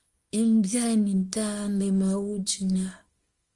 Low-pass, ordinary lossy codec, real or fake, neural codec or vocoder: 10.8 kHz; Opus, 32 kbps; fake; codec, 24 kHz, 0.9 kbps, WavTokenizer, small release